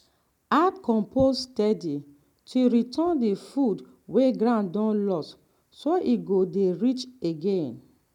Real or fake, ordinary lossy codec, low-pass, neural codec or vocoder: real; none; 19.8 kHz; none